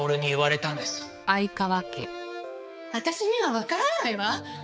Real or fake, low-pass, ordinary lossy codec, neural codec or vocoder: fake; none; none; codec, 16 kHz, 4 kbps, X-Codec, HuBERT features, trained on balanced general audio